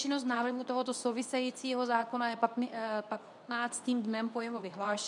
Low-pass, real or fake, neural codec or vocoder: 10.8 kHz; fake; codec, 24 kHz, 0.9 kbps, WavTokenizer, medium speech release version 2